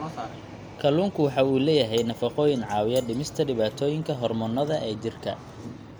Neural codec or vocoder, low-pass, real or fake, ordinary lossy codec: none; none; real; none